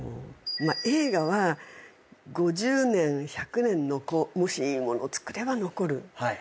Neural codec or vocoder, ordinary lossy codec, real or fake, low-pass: none; none; real; none